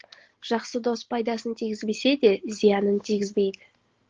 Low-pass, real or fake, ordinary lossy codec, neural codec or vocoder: 7.2 kHz; real; Opus, 16 kbps; none